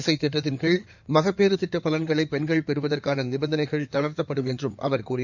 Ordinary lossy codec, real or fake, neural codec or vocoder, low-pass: none; fake; codec, 16 kHz in and 24 kHz out, 2.2 kbps, FireRedTTS-2 codec; 7.2 kHz